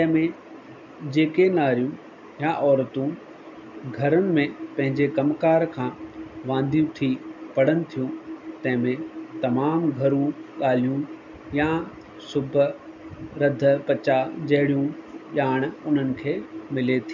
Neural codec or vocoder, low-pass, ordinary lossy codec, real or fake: none; 7.2 kHz; none; real